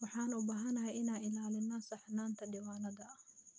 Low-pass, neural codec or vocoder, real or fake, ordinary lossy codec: none; none; real; none